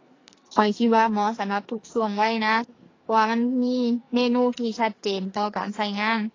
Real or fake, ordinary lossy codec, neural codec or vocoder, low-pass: fake; AAC, 32 kbps; codec, 44.1 kHz, 2.6 kbps, SNAC; 7.2 kHz